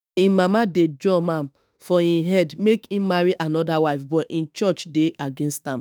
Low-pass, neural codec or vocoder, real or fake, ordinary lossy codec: none; autoencoder, 48 kHz, 32 numbers a frame, DAC-VAE, trained on Japanese speech; fake; none